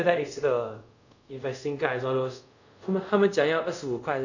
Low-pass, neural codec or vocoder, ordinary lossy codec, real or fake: 7.2 kHz; codec, 24 kHz, 0.5 kbps, DualCodec; none; fake